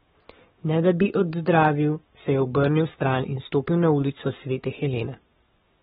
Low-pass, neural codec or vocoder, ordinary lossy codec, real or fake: 19.8 kHz; codec, 44.1 kHz, 7.8 kbps, Pupu-Codec; AAC, 16 kbps; fake